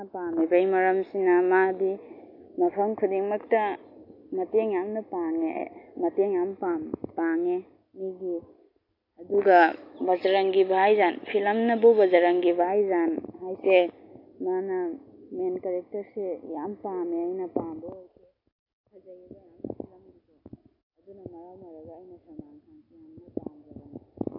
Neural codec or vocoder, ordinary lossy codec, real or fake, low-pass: none; AAC, 32 kbps; real; 5.4 kHz